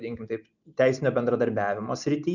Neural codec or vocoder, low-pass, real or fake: none; 7.2 kHz; real